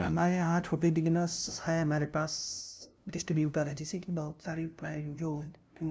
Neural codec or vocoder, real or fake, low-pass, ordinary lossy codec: codec, 16 kHz, 0.5 kbps, FunCodec, trained on LibriTTS, 25 frames a second; fake; none; none